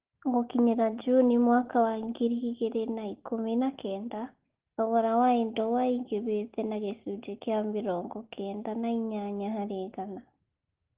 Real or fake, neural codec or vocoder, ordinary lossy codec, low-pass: real; none; Opus, 16 kbps; 3.6 kHz